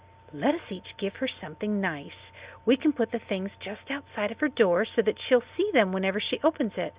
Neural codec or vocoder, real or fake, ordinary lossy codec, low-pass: none; real; Opus, 64 kbps; 3.6 kHz